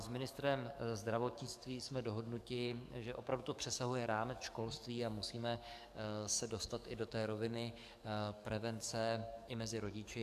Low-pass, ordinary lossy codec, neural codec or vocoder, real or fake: 14.4 kHz; AAC, 96 kbps; codec, 44.1 kHz, 7.8 kbps, DAC; fake